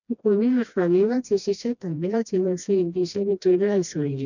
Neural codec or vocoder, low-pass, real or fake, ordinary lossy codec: codec, 16 kHz, 1 kbps, FreqCodec, smaller model; 7.2 kHz; fake; none